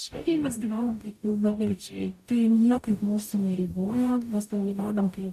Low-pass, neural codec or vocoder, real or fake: 14.4 kHz; codec, 44.1 kHz, 0.9 kbps, DAC; fake